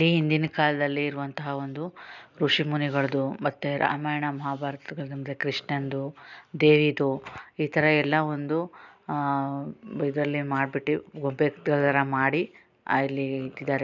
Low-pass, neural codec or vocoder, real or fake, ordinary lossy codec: 7.2 kHz; none; real; none